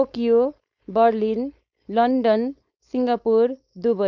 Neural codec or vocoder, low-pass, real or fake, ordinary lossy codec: codec, 16 kHz, 4.8 kbps, FACodec; 7.2 kHz; fake; none